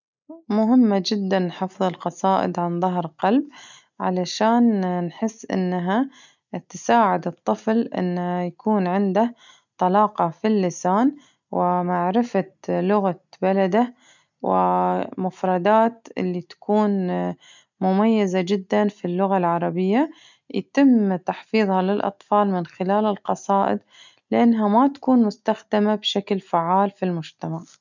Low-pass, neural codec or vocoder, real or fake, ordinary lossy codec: 7.2 kHz; none; real; none